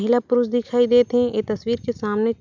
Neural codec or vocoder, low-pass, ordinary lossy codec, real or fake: none; 7.2 kHz; none; real